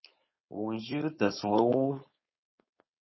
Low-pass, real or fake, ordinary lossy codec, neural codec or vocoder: 7.2 kHz; fake; MP3, 24 kbps; codec, 16 kHz, 4.8 kbps, FACodec